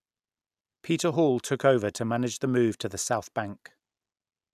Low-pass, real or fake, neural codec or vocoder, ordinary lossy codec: 14.4 kHz; real; none; none